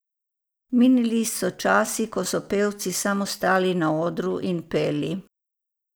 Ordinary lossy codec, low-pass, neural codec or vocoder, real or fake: none; none; none; real